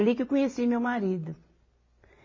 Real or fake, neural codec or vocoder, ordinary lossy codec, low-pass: real; none; MP3, 32 kbps; 7.2 kHz